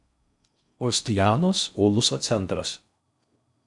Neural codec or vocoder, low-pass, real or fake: codec, 16 kHz in and 24 kHz out, 0.6 kbps, FocalCodec, streaming, 4096 codes; 10.8 kHz; fake